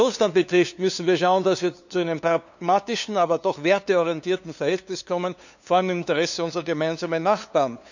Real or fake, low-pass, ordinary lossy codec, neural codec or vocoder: fake; 7.2 kHz; none; codec, 16 kHz, 2 kbps, FunCodec, trained on LibriTTS, 25 frames a second